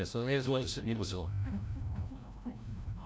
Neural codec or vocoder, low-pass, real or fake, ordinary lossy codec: codec, 16 kHz, 0.5 kbps, FreqCodec, larger model; none; fake; none